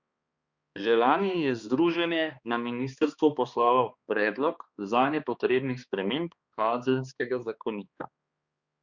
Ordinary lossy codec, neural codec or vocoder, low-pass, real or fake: Opus, 64 kbps; codec, 16 kHz, 2 kbps, X-Codec, HuBERT features, trained on balanced general audio; 7.2 kHz; fake